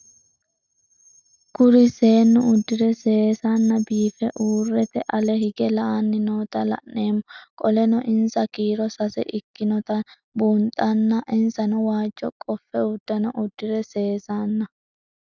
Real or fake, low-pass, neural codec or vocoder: real; 7.2 kHz; none